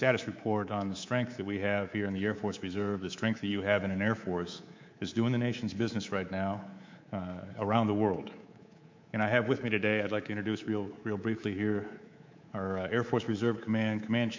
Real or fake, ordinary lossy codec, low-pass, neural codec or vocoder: fake; MP3, 48 kbps; 7.2 kHz; codec, 24 kHz, 3.1 kbps, DualCodec